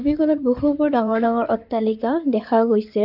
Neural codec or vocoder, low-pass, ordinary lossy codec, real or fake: codec, 16 kHz in and 24 kHz out, 2.2 kbps, FireRedTTS-2 codec; 5.4 kHz; AAC, 48 kbps; fake